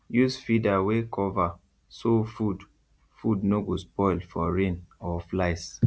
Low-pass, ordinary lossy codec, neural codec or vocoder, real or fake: none; none; none; real